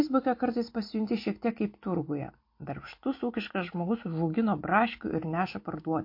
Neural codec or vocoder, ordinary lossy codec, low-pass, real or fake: none; MP3, 32 kbps; 5.4 kHz; real